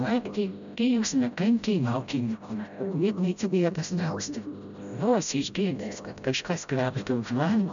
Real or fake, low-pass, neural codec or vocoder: fake; 7.2 kHz; codec, 16 kHz, 0.5 kbps, FreqCodec, smaller model